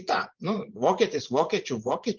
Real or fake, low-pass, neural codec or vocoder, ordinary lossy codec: fake; 7.2 kHz; vocoder, 24 kHz, 100 mel bands, Vocos; Opus, 24 kbps